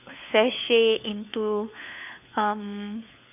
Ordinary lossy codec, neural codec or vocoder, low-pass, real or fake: none; codec, 16 kHz, 4 kbps, FunCodec, trained on LibriTTS, 50 frames a second; 3.6 kHz; fake